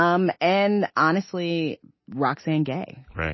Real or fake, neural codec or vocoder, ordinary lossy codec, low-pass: real; none; MP3, 24 kbps; 7.2 kHz